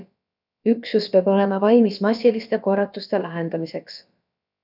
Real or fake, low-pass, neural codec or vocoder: fake; 5.4 kHz; codec, 16 kHz, about 1 kbps, DyCAST, with the encoder's durations